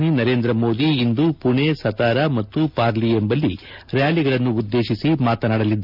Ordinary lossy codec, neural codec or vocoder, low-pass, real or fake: none; none; 5.4 kHz; real